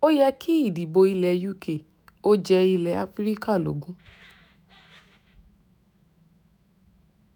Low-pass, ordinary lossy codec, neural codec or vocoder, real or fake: none; none; autoencoder, 48 kHz, 128 numbers a frame, DAC-VAE, trained on Japanese speech; fake